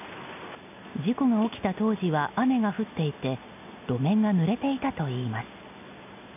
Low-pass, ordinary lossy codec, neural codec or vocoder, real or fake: 3.6 kHz; none; none; real